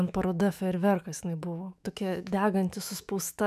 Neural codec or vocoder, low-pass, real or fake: autoencoder, 48 kHz, 128 numbers a frame, DAC-VAE, trained on Japanese speech; 14.4 kHz; fake